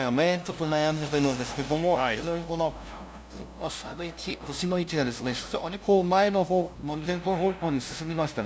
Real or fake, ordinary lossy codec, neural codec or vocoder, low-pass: fake; none; codec, 16 kHz, 0.5 kbps, FunCodec, trained on LibriTTS, 25 frames a second; none